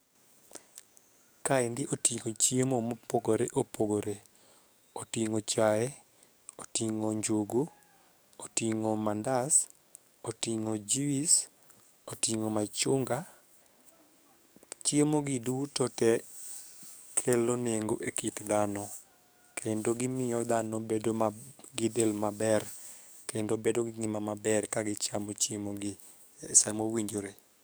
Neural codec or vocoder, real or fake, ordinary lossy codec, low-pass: codec, 44.1 kHz, 7.8 kbps, DAC; fake; none; none